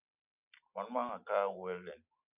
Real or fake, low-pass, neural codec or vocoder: real; 3.6 kHz; none